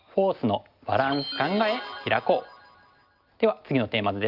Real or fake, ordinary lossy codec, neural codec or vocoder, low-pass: real; Opus, 24 kbps; none; 5.4 kHz